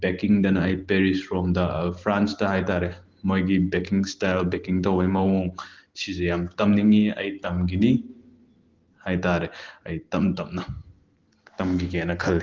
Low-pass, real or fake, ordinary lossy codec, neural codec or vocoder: 7.2 kHz; real; Opus, 16 kbps; none